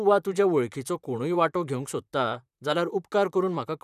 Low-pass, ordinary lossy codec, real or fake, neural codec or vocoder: 14.4 kHz; none; fake; vocoder, 44.1 kHz, 128 mel bands, Pupu-Vocoder